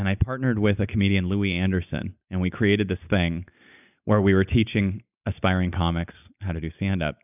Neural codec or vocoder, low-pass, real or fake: none; 3.6 kHz; real